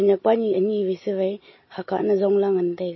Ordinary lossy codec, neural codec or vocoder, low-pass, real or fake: MP3, 24 kbps; none; 7.2 kHz; real